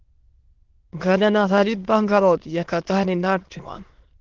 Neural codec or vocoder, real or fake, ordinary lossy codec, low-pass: autoencoder, 22.05 kHz, a latent of 192 numbers a frame, VITS, trained on many speakers; fake; Opus, 16 kbps; 7.2 kHz